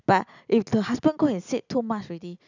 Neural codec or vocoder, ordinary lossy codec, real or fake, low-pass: none; none; real; 7.2 kHz